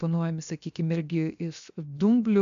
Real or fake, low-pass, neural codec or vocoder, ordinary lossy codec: fake; 7.2 kHz; codec, 16 kHz, 0.7 kbps, FocalCodec; AAC, 64 kbps